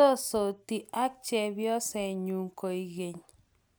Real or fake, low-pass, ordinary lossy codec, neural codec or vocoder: real; none; none; none